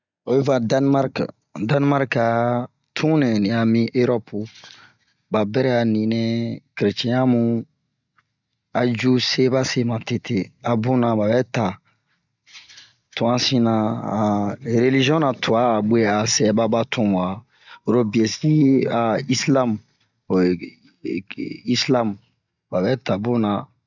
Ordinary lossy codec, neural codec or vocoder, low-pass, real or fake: none; none; 7.2 kHz; real